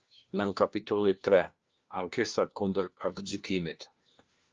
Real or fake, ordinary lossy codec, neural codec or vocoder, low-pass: fake; Opus, 24 kbps; codec, 16 kHz, 1 kbps, FunCodec, trained on LibriTTS, 50 frames a second; 7.2 kHz